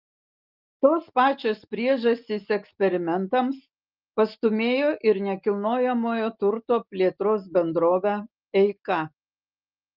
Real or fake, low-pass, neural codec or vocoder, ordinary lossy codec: real; 5.4 kHz; none; Opus, 32 kbps